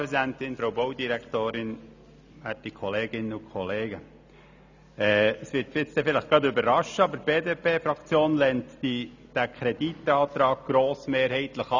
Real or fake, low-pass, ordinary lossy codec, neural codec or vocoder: real; 7.2 kHz; none; none